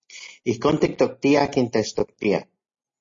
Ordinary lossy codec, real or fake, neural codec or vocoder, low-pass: MP3, 32 kbps; real; none; 7.2 kHz